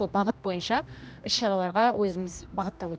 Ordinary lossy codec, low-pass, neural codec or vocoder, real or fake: none; none; codec, 16 kHz, 1 kbps, X-Codec, HuBERT features, trained on general audio; fake